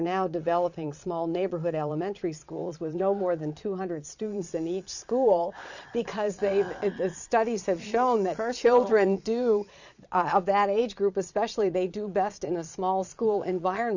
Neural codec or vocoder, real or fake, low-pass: vocoder, 22.05 kHz, 80 mel bands, Vocos; fake; 7.2 kHz